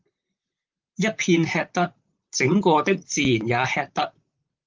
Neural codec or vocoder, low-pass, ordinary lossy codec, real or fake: vocoder, 44.1 kHz, 128 mel bands, Pupu-Vocoder; 7.2 kHz; Opus, 32 kbps; fake